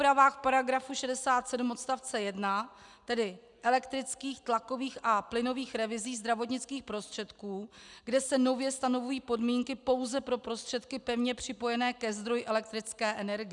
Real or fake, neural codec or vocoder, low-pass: real; none; 10.8 kHz